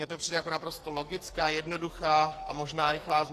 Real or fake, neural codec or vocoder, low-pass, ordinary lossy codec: fake; codec, 44.1 kHz, 2.6 kbps, SNAC; 14.4 kHz; AAC, 48 kbps